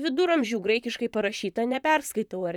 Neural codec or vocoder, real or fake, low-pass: vocoder, 44.1 kHz, 128 mel bands, Pupu-Vocoder; fake; 19.8 kHz